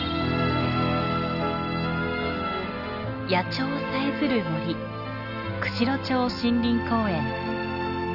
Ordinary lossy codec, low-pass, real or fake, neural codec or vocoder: none; 5.4 kHz; real; none